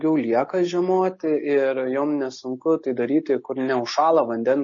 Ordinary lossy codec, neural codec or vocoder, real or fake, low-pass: MP3, 32 kbps; none; real; 7.2 kHz